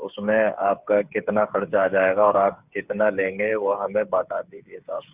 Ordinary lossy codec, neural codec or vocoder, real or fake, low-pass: Opus, 64 kbps; codec, 16 kHz, 8 kbps, FreqCodec, smaller model; fake; 3.6 kHz